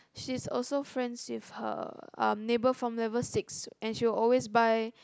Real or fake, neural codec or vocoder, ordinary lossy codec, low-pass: real; none; none; none